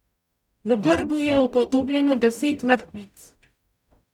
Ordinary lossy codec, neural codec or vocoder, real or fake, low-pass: none; codec, 44.1 kHz, 0.9 kbps, DAC; fake; 19.8 kHz